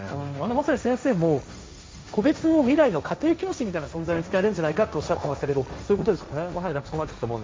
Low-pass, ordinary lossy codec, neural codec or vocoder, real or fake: none; none; codec, 16 kHz, 1.1 kbps, Voila-Tokenizer; fake